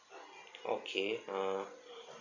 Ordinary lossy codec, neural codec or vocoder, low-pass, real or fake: none; none; 7.2 kHz; real